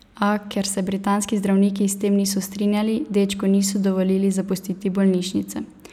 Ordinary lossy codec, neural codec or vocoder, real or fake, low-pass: none; none; real; 19.8 kHz